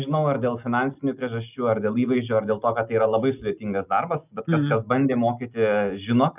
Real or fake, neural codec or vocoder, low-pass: real; none; 3.6 kHz